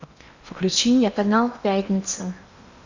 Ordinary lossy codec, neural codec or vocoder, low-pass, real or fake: Opus, 64 kbps; codec, 16 kHz in and 24 kHz out, 0.8 kbps, FocalCodec, streaming, 65536 codes; 7.2 kHz; fake